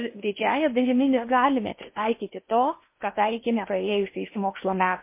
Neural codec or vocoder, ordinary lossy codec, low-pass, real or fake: codec, 16 kHz in and 24 kHz out, 0.8 kbps, FocalCodec, streaming, 65536 codes; MP3, 24 kbps; 3.6 kHz; fake